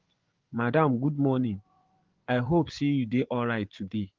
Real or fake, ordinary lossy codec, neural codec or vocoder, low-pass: fake; Opus, 16 kbps; vocoder, 24 kHz, 100 mel bands, Vocos; 7.2 kHz